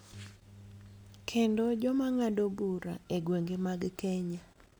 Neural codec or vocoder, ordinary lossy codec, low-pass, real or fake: none; none; none; real